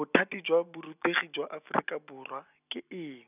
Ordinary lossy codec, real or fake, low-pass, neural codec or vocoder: none; real; 3.6 kHz; none